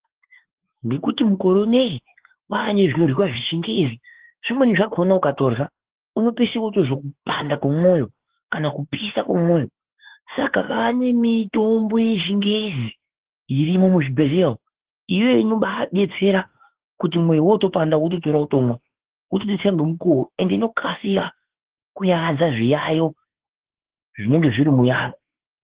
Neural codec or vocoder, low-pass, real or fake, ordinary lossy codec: autoencoder, 48 kHz, 32 numbers a frame, DAC-VAE, trained on Japanese speech; 3.6 kHz; fake; Opus, 16 kbps